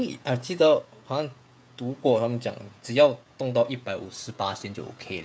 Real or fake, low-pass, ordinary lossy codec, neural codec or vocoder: fake; none; none; codec, 16 kHz, 16 kbps, FreqCodec, smaller model